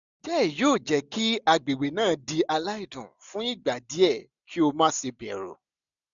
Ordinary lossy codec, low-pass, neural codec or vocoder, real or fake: none; 7.2 kHz; none; real